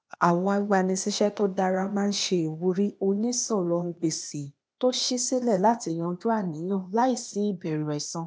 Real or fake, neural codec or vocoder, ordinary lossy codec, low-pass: fake; codec, 16 kHz, 0.8 kbps, ZipCodec; none; none